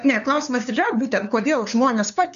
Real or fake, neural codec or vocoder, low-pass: fake; codec, 16 kHz, 2 kbps, FunCodec, trained on LibriTTS, 25 frames a second; 7.2 kHz